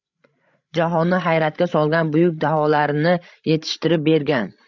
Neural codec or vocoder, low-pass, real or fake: codec, 16 kHz, 16 kbps, FreqCodec, larger model; 7.2 kHz; fake